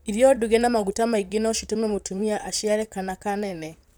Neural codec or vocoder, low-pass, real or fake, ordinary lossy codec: vocoder, 44.1 kHz, 128 mel bands, Pupu-Vocoder; none; fake; none